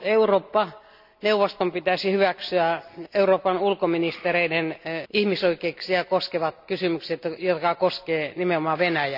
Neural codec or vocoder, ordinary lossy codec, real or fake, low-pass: none; none; real; 5.4 kHz